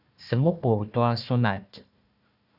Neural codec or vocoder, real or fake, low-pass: codec, 16 kHz, 1 kbps, FunCodec, trained on Chinese and English, 50 frames a second; fake; 5.4 kHz